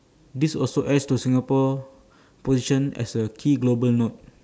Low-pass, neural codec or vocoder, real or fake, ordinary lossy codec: none; none; real; none